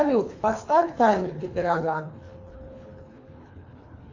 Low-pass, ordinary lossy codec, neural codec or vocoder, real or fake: 7.2 kHz; AAC, 48 kbps; codec, 24 kHz, 3 kbps, HILCodec; fake